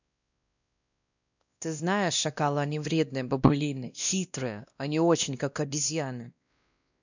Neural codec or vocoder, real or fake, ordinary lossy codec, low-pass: codec, 16 kHz, 1 kbps, X-Codec, WavLM features, trained on Multilingual LibriSpeech; fake; none; 7.2 kHz